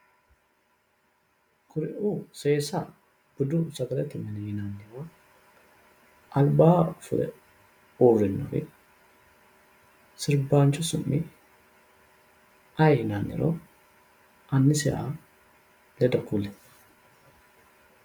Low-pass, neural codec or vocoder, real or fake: 19.8 kHz; none; real